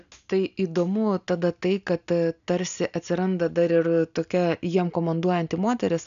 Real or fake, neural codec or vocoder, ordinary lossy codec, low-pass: real; none; AAC, 96 kbps; 7.2 kHz